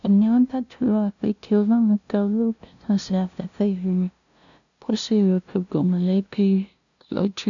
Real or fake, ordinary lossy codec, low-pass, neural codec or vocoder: fake; none; 7.2 kHz; codec, 16 kHz, 0.5 kbps, FunCodec, trained on LibriTTS, 25 frames a second